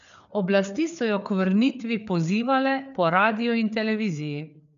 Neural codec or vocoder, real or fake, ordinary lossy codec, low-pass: codec, 16 kHz, 4 kbps, FreqCodec, larger model; fake; none; 7.2 kHz